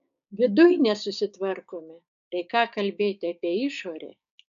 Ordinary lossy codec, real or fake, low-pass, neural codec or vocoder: AAC, 96 kbps; fake; 7.2 kHz; codec, 16 kHz, 6 kbps, DAC